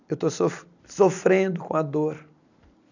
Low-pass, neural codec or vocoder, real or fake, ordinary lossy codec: 7.2 kHz; none; real; none